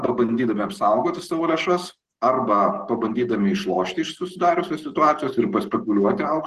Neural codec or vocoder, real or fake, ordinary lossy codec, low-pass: none; real; Opus, 16 kbps; 14.4 kHz